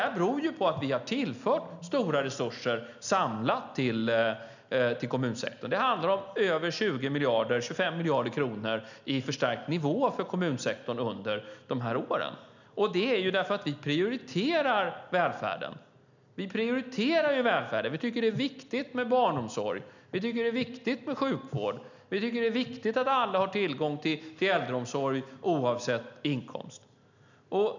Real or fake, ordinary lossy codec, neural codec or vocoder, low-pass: real; none; none; 7.2 kHz